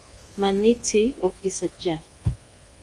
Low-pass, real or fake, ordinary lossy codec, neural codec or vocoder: 10.8 kHz; fake; Opus, 32 kbps; codec, 24 kHz, 1.2 kbps, DualCodec